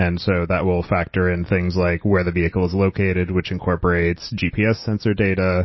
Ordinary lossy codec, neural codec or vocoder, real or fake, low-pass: MP3, 24 kbps; none; real; 7.2 kHz